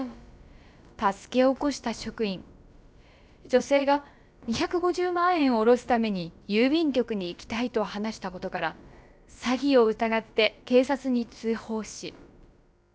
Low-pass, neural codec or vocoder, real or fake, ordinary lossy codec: none; codec, 16 kHz, about 1 kbps, DyCAST, with the encoder's durations; fake; none